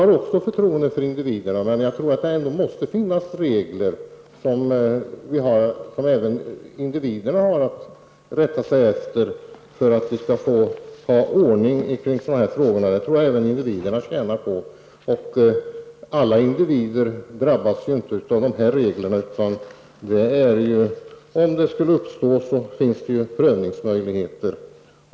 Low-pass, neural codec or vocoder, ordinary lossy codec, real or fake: none; none; none; real